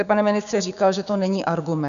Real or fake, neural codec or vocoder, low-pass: fake; codec, 16 kHz, 6 kbps, DAC; 7.2 kHz